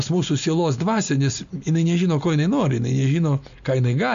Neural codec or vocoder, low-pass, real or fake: none; 7.2 kHz; real